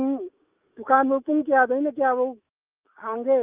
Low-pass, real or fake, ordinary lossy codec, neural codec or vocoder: 3.6 kHz; fake; Opus, 24 kbps; autoencoder, 48 kHz, 128 numbers a frame, DAC-VAE, trained on Japanese speech